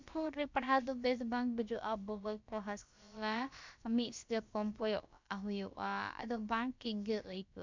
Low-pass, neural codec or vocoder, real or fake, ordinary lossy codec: 7.2 kHz; codec, 16 kHz, about 1 kbps, DyCAST, with the encoder's durations; fake; MP3, 64 kbps